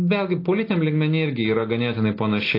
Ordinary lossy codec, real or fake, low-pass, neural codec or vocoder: AAC, 32 kbps; real; 5.4 kHz; none